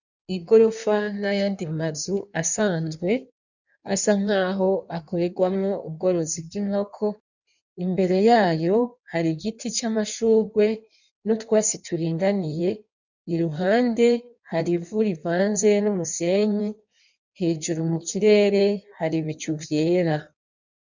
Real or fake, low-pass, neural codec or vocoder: fake; 7.2 kHz; codec, 16 kHz in and 24 kHz out, 1.1 kbps, FireRedTTS-2 codec